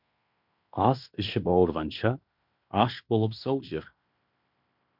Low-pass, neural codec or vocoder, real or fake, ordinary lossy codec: 5.4 kHz; codec, 16 kHz in and 24 kHz out, 0.9 kbps, LongCat-Audio-Codec, fine tuned four codebook decoder; fake; MP3, 48 kbps